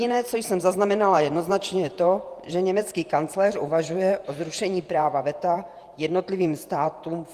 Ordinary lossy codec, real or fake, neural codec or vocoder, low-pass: Opus, 32 kbps; fake; vocoder, 48 kHz, 128 mel bands, Vocos; 14.4 kHz